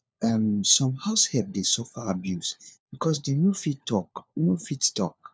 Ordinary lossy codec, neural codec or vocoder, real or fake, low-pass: none; codec, 16 kHz, 4 kbps, FunCodec, trained on LibriTTS, 50 frames a second; fake; none